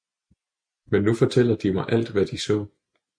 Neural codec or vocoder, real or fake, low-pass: none; real; 9.9 kHz